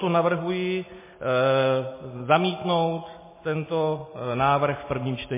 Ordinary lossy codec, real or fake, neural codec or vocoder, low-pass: MP3, 16 kbps; real; none; 3.6 kHz